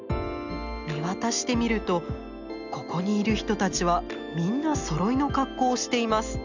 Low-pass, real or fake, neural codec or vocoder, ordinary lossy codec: 7.2 kHz; real; none; none